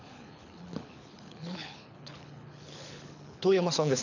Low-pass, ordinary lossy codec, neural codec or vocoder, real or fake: 7.2 kHz; none; codec, 24 kHz, 6 kbps, HILCodec; fake